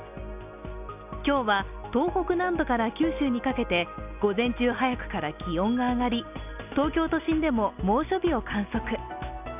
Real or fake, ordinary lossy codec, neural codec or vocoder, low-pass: real; none; none; 3.6 kHz